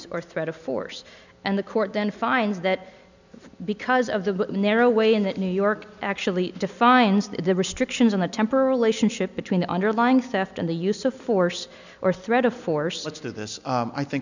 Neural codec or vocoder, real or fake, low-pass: none; real; 7.2 kHz